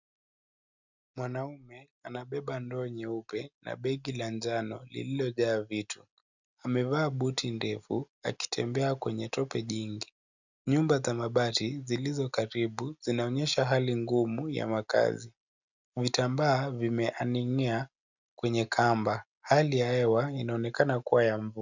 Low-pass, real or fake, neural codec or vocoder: 7.2 kHz; real; none